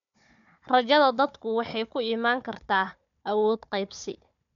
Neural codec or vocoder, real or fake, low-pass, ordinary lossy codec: codec, 16 kHz, 4 kbps, FunCodec, trained on Chinese and English, 50 frames a second; fake; 7.2 kHz; none